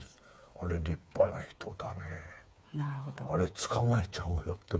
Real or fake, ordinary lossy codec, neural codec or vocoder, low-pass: fake; none; codec, 16 kHz, 4 kbps, FreqCodec, smaller model; none